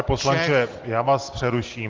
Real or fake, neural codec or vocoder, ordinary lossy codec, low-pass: real; none; Opus, 16 kbps; 7.2 kHz